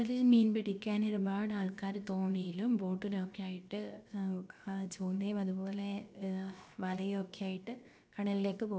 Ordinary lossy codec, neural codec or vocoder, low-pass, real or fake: none; codec, 16 kHz, 0.7 kbps, FocalCodec; none; fake